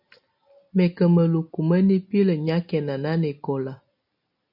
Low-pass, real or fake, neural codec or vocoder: 5.4 kHz; real; none